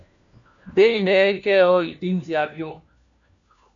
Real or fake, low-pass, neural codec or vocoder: fake; 7.2 kHz; codec, 16 kHz, 1 kbps, FunCodec, trained on LibriTTS, 50 frames a second